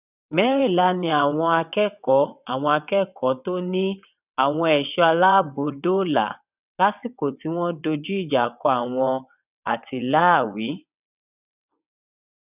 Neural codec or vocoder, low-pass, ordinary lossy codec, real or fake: vocoder, 22.05 kHz, 80 mel bands, WaveNeXt; 3.6 kHz; none; fake